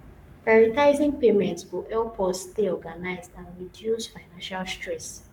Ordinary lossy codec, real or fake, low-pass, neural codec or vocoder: none; fake; 19.8 kHz; codec, 44.1 kHz, 7.8 kbps, Pupu-Codec